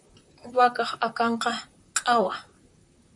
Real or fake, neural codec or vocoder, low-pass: fake; vocoder, 44.1 kHz, 128 mel bands, Pupu-Vocoder; 10.8 kHz